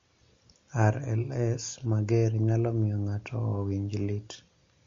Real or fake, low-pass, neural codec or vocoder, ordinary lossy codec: real; 7.2 kHz; none; MP3, 48 kbps